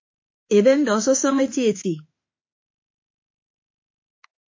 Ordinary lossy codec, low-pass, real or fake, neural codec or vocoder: MP3, 32 kbps; 7.2 kHz; fake; autoencoder, 48 kHz, 32 numbers a frame, DAC-VAE, trained on Japanese speech